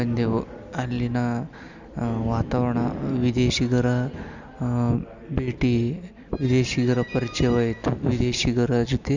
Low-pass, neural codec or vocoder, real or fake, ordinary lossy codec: 7.2 kHz; none; real; Opus, 64 kbps